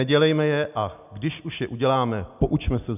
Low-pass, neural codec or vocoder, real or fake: 3.6 kHz; none; real